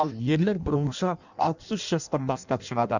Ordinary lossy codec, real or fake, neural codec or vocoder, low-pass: none; fake; codec, 16 kHz in and 24 kHz out, 0.6 kbps, FireRedTTS-2 codec; 7.2 kHz